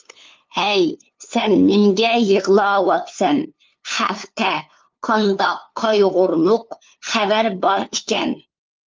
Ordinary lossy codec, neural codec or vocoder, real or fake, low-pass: Opus, 24 kbps; codec, 16 kHz, 2 kbps, FunCodec, trained on LibriTTS, 25 frames a second; fake; 7.2 kHz